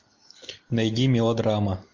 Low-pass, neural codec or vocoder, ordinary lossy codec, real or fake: 7.2 kHz; none; MP3, 48 kbps; real